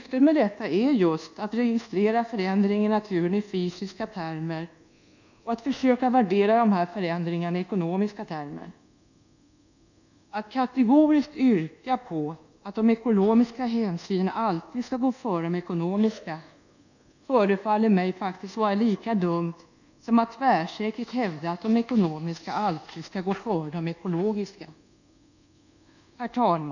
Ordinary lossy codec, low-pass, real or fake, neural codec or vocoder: none; 7.2 kHz; fake; codec, 24 kHz, 1.2 kbps, DualCodec